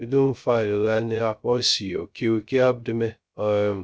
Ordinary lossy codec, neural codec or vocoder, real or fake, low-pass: none; codec, 16 kHz, 0.2 kbps, FocalCodec; fake; none